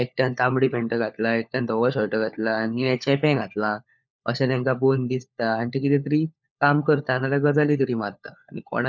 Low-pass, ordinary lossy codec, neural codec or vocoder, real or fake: none; none; codec, 16 kHz, 4 kbps, FunCodec, trained on LibriTTS, 50 frames a second; fake